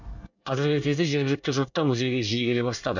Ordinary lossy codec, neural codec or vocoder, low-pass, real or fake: none; codec, 24 kHz, 1 kbps, SNAC; 7.2 kHz; fake